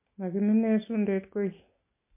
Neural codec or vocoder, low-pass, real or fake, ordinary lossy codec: none; 3.6 kHz; real; MP3, 24 kbps